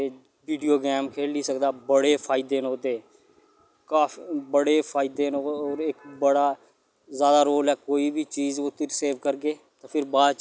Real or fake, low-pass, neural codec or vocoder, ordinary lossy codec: real; none; none; none